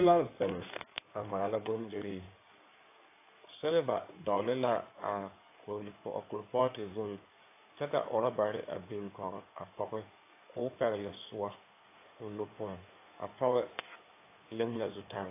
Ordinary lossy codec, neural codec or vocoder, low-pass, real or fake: AAC, 24 kbps; codec, 16 kHz in and 24 kHz out, 2.2 kbps, FireRedTTS-2 codec; 3.6 kHz; fake